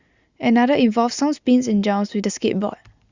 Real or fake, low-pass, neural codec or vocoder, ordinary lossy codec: real; 7.2 kHz; none; Opus, 64 kbps